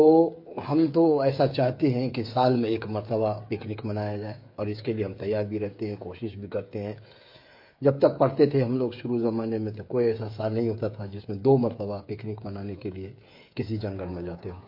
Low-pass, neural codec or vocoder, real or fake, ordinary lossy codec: 5.4 kHz; codec, 16 kHz, 8 kbps, FreqCodec, smaller model; fake; MP3, 32 kbps